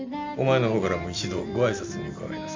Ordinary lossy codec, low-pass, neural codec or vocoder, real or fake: MP3, 64 kbps; 7.2 kHz; none; real